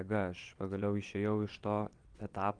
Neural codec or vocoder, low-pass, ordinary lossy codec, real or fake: vocoder, 22.05 kHz, 80 mel bands, WaveNeXt; 9.9 kHz; Opus, 32 kbps; fake